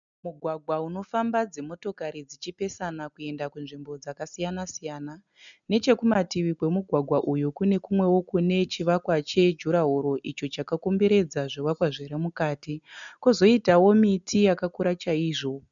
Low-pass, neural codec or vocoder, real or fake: 7.2 kHz; none; real